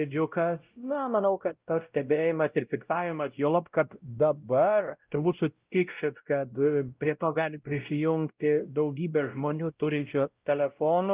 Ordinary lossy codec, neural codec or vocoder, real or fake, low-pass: Opus, 32 kbps; codec, 16 kHz, 0.5 kbps, X-Codec, WavLM features, trained on Multilingual LibriSpeech; fake; 3.6 kHz